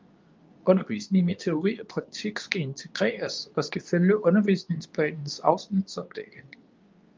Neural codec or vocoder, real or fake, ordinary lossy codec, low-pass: codec, 24 kHz, 0.9 kbps, WavTokenizer, medium speech release version 2; fake; Opus, 24 kbps; 7.2 kHz